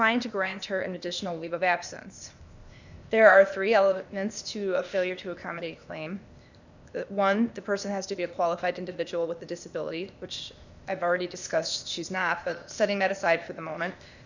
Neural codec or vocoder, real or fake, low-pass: codec, 16 kHz, 0.8 kbps, ZipCodec; fake; 7.2 kHz